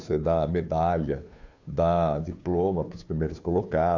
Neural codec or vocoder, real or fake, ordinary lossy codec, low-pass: codec, 16 kHz, 2 kbps, FunCodec, trained on Chinese and English, 25 frames a second; fake; AAC, 48 kbps; 7.2 kHz